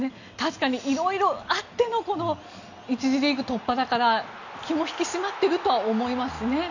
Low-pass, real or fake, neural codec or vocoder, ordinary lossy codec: 7.2 kHz; real; none; none